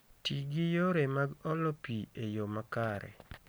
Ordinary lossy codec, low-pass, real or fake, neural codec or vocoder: none; none; real; none